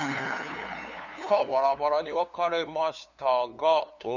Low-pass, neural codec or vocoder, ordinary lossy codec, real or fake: 7.2 kHz; codec, 16 kHz, 2 kbps, FunCodec, trained on LibriTTS, 25 frames a second; none; fake